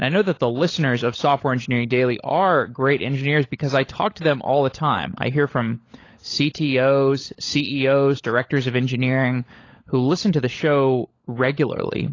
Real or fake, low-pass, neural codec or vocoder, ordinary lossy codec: fake; 7.2 kHz; codec, 16 kHz, 16 kbps, FunCodec, trained on LibriTTS, 50 frames a second; AAC, 32 kbps